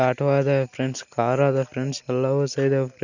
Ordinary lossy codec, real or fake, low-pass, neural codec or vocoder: none; real; 7.2 kHz; none